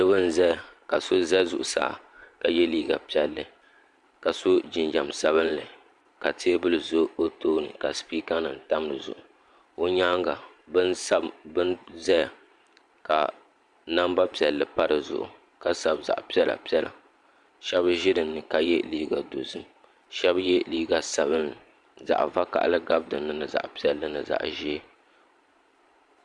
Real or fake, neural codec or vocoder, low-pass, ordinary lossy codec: fake; vocoder, 44.1 kHz, 128 mel bands every 512 samples, BigVGAN v2; 10.8 kHz; Opus, 64 kbps